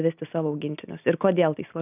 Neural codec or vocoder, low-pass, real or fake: none; 3.6 kHz; real